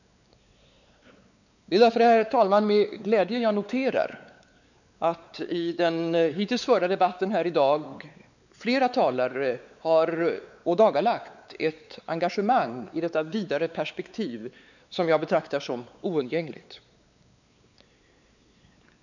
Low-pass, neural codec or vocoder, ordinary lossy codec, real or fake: 7.2 kHz; codec, 16 kHz, 4 kbps, X-Codec, WavLM features, trained on Multilingual LibriSpeech; none; fake